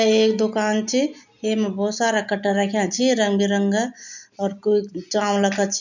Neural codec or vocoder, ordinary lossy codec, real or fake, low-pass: none; none; real; 7.2 kHz